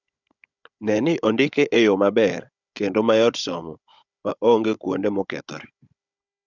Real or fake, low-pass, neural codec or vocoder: fake; 7.2 kHz; codec, 16 kHz, 16 kbps, FunCodec, trained on Chinese and English, 50 frames a second